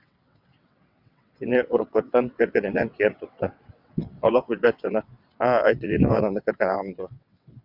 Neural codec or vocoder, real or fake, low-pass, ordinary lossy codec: vocoder, 22.05 kHz, 80 mel bands, WaveNeXt; fake; 5.4 kHz; Opus, 64 kbps